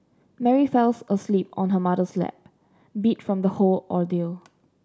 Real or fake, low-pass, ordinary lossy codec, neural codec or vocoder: real; none; none; none